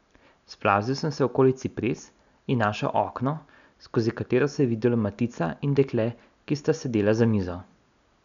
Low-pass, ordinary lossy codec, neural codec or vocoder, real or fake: 7.2 kHz; none; none; real